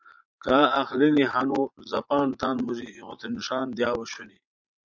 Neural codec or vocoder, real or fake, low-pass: vocoder, 44.1 kHz, 80 mel bands, Vocos; fake; 7.2 kHz